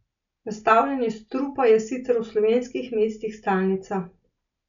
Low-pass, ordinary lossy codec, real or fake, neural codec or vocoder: 7.2 kHz; none; real; none